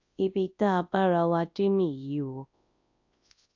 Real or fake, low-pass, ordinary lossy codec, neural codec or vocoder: fake; 7.2 kHz; AAC, 48 kbps; codec, 24 kHz, 0.9 kbps, WavTokenizer, large speech release